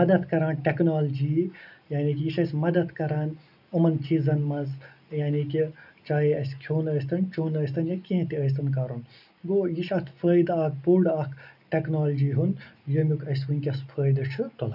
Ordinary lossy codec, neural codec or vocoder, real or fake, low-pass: none; none; real; 5.4 kHz